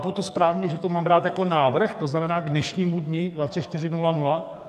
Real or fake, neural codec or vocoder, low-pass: fake; codec, 44.1 kHz, 2.6 kbps, SNAC; 14.4 kHz